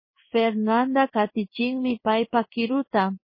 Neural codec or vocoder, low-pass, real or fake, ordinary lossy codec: codec, 16 kHz in and 24 kHz out, 1 kbps, XY-Tokenizer; 5.4 kHz; fake; MP3, 24 kbps